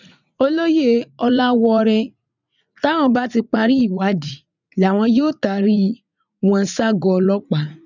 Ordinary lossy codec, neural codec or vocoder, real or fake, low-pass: none; vocoder, 44.1 kHz, 80 mel bands, Vocos; fake; 7.2 kHz